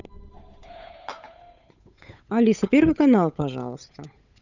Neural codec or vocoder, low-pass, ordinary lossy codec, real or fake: codec, 16 kHz, 8 kbps, FunCodec, trained on Chinese and English, 25 frames a second; 7.2 kHz; none; fake